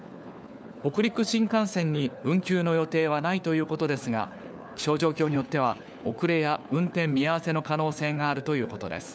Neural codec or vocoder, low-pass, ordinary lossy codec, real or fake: codec, 16 kHz, 4 kbps, FunCodec, trained on LibriTTS, 50 frames a second; none; none; fake